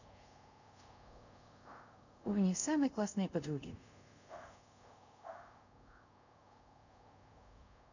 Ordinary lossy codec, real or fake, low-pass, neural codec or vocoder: none; fake; 7.2 kHz; codec, 24 kHz, 0.5 kbps, DualCodec